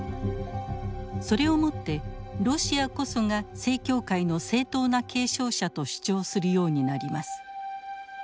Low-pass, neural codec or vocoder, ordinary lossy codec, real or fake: none; none; none; real